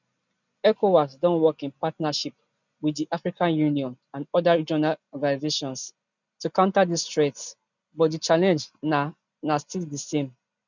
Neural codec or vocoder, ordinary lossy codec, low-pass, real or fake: none; none; 7.2 kHz; real